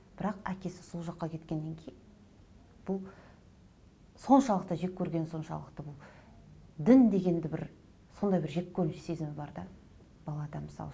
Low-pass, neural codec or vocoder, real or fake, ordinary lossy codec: none; none; real; none